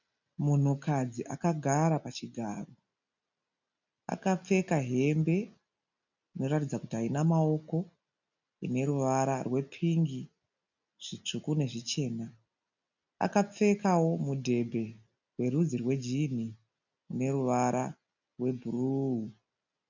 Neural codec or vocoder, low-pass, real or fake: none; 7.2 kHz; real